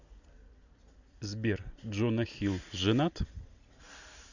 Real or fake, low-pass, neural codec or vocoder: real; 7.2 kHz; none